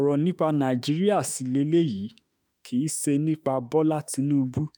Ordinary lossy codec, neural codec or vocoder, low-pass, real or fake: none; autoencoder, 48 kHz, 32 numbers a frame, DAC-VAE, trained on Japanese speech; none; fake